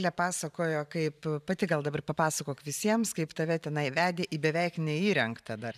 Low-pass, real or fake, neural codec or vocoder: 14.4 kHz; fake; vocoder, 44.1 kHz, 128 mel bands every 512 samples, BigVGAN v2